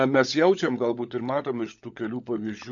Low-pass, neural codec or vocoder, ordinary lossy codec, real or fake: 7.2 kHz; codec, 16 kHz, 16 kbps, FunCodec, trained on LibriTTS, 50 frames a second; MP3, 64 kbps; fake